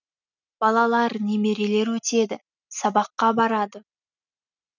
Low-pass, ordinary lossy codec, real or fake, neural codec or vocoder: 7.2 kHz; none; real; none